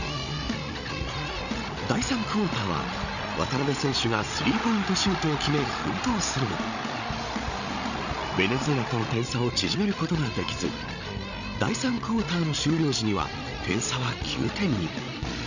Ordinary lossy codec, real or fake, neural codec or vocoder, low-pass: none; fake; codec, 16 kHz, 16 kbps, FreqCodec, larger model; 7.2 kHz